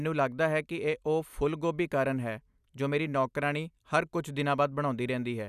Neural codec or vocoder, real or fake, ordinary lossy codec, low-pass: none; real; none; 14.4 kHz